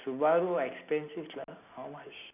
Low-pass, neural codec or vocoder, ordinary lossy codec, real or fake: 3.6 kHz; none; none; real